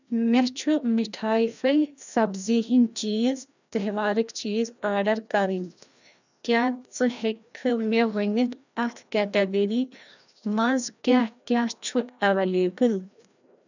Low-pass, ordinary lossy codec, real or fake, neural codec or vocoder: 7.2 kHz; none; fake; codec, 16 kHz, 1 kbps, FreqCodec, larger model